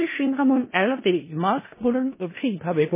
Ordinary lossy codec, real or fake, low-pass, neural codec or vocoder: MP3, 16 kbps; fake; 3.6 kHz; codec, 16 kHz in and 24 kHz out, 0.4 kbps, LongCat-Audio-Codec, four codebook decoder